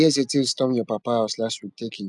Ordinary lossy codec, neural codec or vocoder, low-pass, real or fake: none; none; 10.8 kHz; real